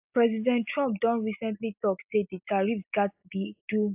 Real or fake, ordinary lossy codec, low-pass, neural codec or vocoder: real; AAC, 32 kbps; 3.6 kHz; none